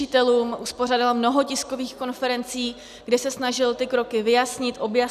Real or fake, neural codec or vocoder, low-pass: real; none; 14.4 kHz